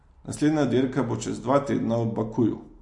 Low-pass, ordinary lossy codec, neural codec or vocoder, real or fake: 10.8 kHz; MP3, 48 kbps; none; real